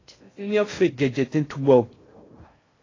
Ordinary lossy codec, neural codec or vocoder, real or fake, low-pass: AAC, 32 kbps; codec, 16 kHz in and 24 kHz out, 0.6 kbps, FocalCodec, streaming, 2048 codes; fake; 7.2 kHz